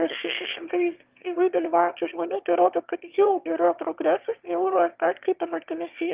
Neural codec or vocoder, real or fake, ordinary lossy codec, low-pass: autoencoder, 22.05 kHz, a latent of 192 numbers a frame, VITS, trained on one speaker; fake; Opus, 32 kbps; 3.6 kHz